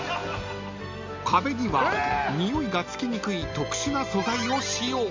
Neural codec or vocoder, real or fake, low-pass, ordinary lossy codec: none; real; 7.2 kHz; MP3, 64 kbps